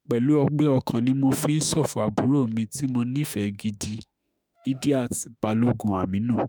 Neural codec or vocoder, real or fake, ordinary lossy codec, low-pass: autoencoder, 48 kHz, 32 numbers a frame, DAC-VAE, trained on Japanese speech; fake; none; none